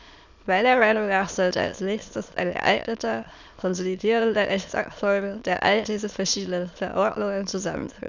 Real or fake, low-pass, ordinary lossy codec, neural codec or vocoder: fake; 7.2 kHz; none; autoencoder, 22.05 kHz, a latent of 192 numbers a frame, VITS, trained on many speakers